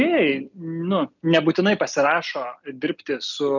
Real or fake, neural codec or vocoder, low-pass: real; none; 7.2 kHz